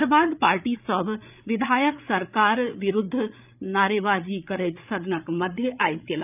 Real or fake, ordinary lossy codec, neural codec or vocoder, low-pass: fake; none; codec, 16 kHz, 8 kbps, FreqCodec, larger model; 3.6 kHz